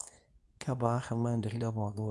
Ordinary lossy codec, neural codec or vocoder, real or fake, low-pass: none; codec, 24 kHz, 0.9 kbps, WavTokenizer, medium speech release version 2; fake; none